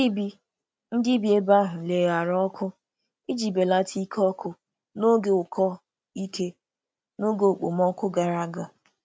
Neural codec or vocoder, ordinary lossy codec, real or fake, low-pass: none; none; real; none